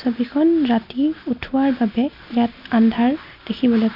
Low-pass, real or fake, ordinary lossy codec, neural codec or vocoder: 5.4 kHz; real; none; none